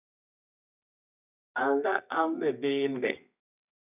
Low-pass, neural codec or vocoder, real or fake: 3.6 kHz; codec, 24 kHz, 0.9 kbps, WavTokenizer, medium music audio release; fake